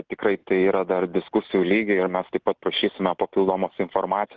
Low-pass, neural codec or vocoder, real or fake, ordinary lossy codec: 7.2 kHz; none; real; Opus, 16 kbps